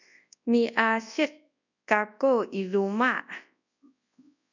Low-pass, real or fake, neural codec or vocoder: 7.2 kHz; fake; codec, 24 kHz, 0.9 kbps, WavTokenizer, large speech release